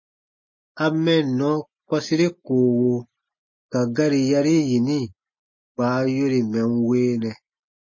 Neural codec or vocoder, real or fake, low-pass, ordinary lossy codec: none; real; 7.2 kHz; MP3, 32 kbps